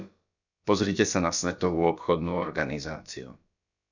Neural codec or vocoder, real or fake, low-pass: codec, 16 kHz, about 1 kbps, DyCAST, with the encoder's durations; fake; 7.2 kHz